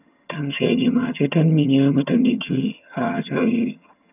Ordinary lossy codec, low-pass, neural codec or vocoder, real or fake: none; 3.6 kHz; vocoder, 22.05 kHz, 80 mel bands, HiFi-GAN; fake